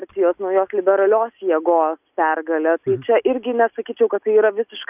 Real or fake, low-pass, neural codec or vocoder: real; 3.6 kHz; none